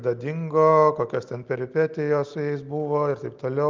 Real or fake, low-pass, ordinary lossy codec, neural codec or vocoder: real; 7.2 kHz; Opus, 32 kbps; none